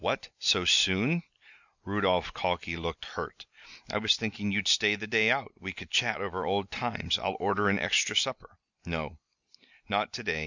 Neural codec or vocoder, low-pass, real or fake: none; 7.2 kHz; real